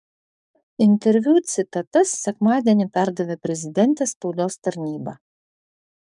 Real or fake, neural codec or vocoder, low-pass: fake; codec, 44.1 kHz, 7.8 kbps, DAC; 10.8 kHz